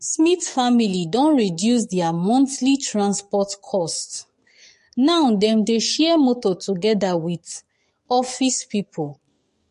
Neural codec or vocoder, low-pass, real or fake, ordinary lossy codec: codec, 44.1 kHz, 7.8 kbps, DAC; 14.4 kHz; fake; MP3, 48 kbps